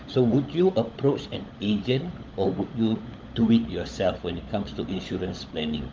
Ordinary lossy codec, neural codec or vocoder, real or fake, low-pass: Opus, 32 kbps; codec, 16 kHz, 16 kbps, FunCodec, trained on LibriTTS, 50 frames a second; fake; 7.2 kHz